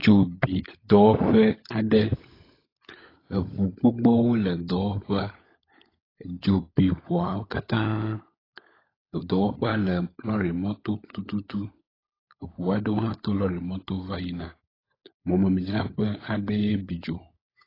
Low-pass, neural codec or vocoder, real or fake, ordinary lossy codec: 5.4 kHz; codec, 16 kHz, 16 kbps, FunCodec, trained on LibriTTS, 50 frames a second; fake; AAC, 24 kbps